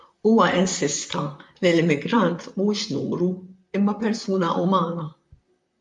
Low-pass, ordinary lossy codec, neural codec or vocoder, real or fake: 10.8 kHz; MP3, 64 kbps; vocoder, 44.1 kHz, 128 mel bands, Pupu-Vocoder; fake